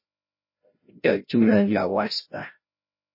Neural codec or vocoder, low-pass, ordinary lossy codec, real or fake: codec, 16 kHz, 0.5 kbps, FreqCodec, larger model; 5.4 kHz; MP3, 24 kbps; fake